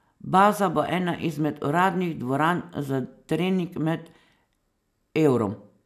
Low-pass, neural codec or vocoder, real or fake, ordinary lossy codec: 14.4 kHz; none; real; none